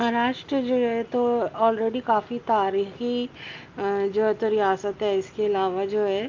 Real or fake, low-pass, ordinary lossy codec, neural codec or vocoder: real; 7.2 kHz; Opus, 24 kbps; none